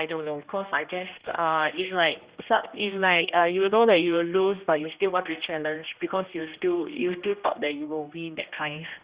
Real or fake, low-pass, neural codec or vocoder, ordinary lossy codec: fake; 3.6 kHz; codec, 16 kHz, 1 kbps, X-Codec, HuBERT features, trained on general audio; Opus, 64 kbps